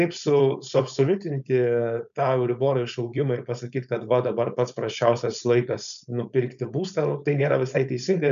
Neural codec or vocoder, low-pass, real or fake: codec, 16 kHz, 4.8 kbps, FACodec; 7.2 kHz; fake